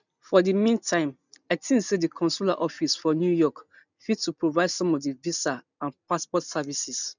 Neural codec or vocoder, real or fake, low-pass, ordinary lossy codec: none; real; 7.2 kHz; none